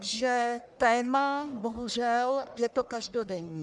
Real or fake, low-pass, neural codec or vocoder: fake; 10.8 kHz; codec, 44.1 kHz, 1.7 kbps, Pupu-Codec